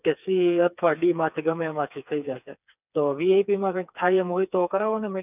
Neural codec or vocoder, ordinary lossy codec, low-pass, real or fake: codec, 16 kHz, 4 kbps, FreqCodec, smaller model; none; 3.6 kHz; fake